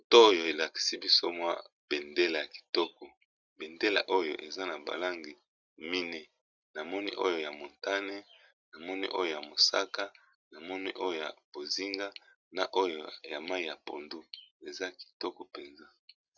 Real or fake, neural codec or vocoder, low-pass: real; none; 7.2 kHz